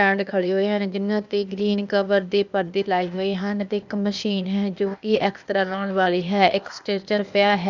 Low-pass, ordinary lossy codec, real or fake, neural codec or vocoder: 7.2 kHz; none; fake; codec, 16 kHz, 0.8 kbps, ZipCodec